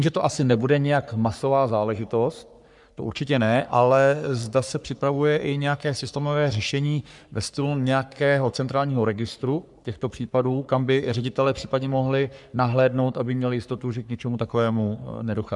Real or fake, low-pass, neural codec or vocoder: fake; 10.8 kHz; codec, 44.1 kHz, 3.4 kbps, Pupu-Codec